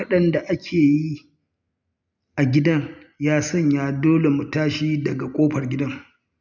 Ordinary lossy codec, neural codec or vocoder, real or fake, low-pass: none; none; real; 7.2 kHz